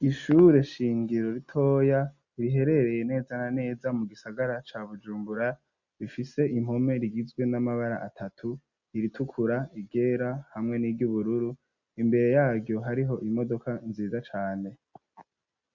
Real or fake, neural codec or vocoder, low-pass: real; none; 7.2 kHz